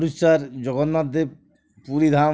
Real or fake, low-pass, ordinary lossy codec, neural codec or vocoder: real; none; none; none